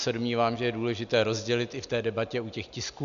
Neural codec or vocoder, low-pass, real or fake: none; 7.2 kHz; real